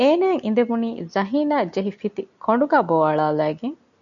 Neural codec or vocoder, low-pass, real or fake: none; 7.2 kHz; real